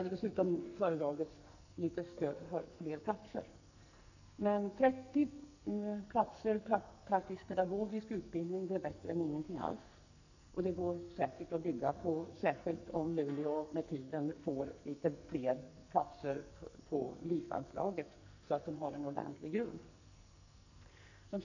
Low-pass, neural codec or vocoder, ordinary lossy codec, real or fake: 7.2 kHz; codec, 44.1 kHz, 2.6 kbps, SNAC; none; fake